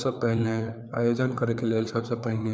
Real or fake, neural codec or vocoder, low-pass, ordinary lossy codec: fake; codec, 16 kHz, 4 kbps, FreqCodec, larger model; none; none